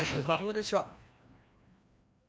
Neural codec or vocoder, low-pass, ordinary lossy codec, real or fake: codec, 16 kHz, 1 kbps, FreqCodec, larger model; none; none; fake